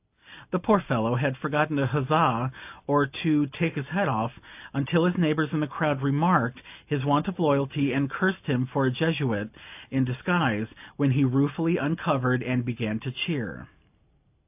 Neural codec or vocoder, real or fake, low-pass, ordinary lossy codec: none; real; 3.6 kHz; AAC, 32 kbps